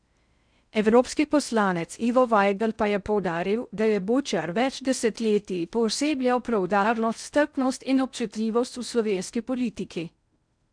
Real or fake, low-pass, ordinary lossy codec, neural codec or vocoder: fake; 9.9 kHz; none; codec, 16 kHz in and 24 kHz out, 0.6 kbps, FocalCodec, streaming, 4096 codes